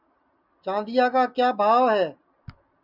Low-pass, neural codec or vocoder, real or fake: 5.4 kHz; none; real